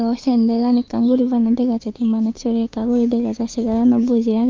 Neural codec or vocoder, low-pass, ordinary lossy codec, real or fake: codec, 16 kHz, 6 kbps, DAC; 7.2 kHz; Opus, 32 kbps; fake